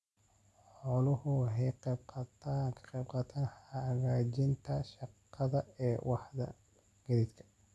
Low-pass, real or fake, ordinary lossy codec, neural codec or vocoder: none; real; none; none